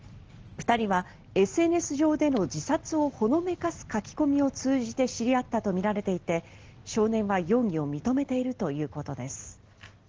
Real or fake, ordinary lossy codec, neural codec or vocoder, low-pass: real; Opus, 16 kbps; none; 7.2 kHz